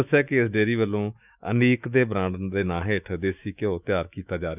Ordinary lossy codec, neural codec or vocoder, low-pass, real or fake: none; autoencoder, 48 kHz, 128 numbers a frame, DAC-VAE, trained on Japanese speech; 3.6 kHz; fake